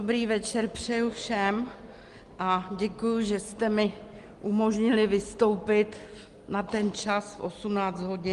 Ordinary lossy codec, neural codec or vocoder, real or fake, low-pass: Opus, 24 kbps; none; real; 10.8 kHz